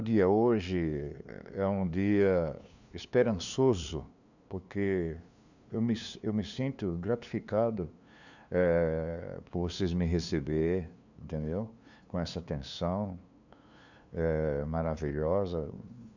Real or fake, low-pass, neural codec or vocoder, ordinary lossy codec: fake; 7.2 kHz; codec, 16 kHz, 2 kbps, FunCodec, trained on LibriTTS, 25 frames a second; none